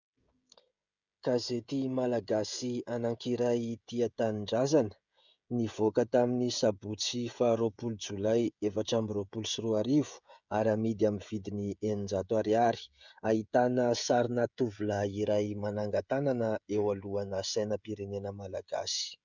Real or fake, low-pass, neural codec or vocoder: fake; 7.2 kHz; codec, 16 kHz, 16 kbps, FreqCodec, smaller model